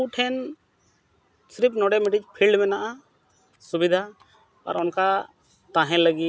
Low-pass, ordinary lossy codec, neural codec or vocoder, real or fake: none; none; none; real